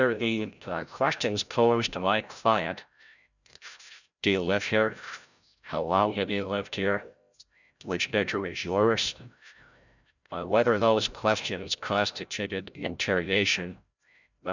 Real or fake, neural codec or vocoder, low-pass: fake; codec, 16 kHz, 0.5 kbps, FreqCodec, larger model; 7.2 kHz